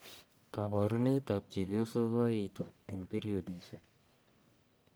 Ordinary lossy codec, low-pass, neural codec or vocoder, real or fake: none; none; codec, 44.1 kHz, 1.7 kbps, Pupu-Codec; fake